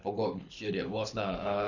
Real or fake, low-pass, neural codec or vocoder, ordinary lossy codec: fake; 7.2 kHz; codec, 24 kHz, 6 kbps, HILCodec; none